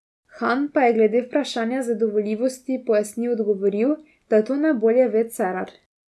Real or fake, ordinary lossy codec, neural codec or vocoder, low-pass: real; none; none; none